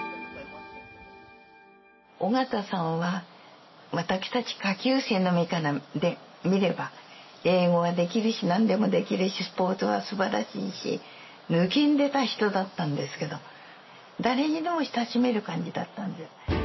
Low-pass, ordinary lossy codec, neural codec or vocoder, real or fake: 7.2 kHz; MP3, 24 kbps; none; real